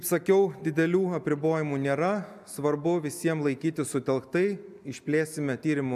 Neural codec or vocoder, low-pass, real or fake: none; 14.4 kHz; real